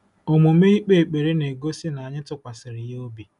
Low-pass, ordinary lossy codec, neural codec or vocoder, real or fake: 10.8 kHz; none; none; real